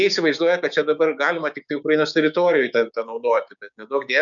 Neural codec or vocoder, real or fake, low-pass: codec, 16 kHz, 6 kbps, DAC; fake; 7.2 kHz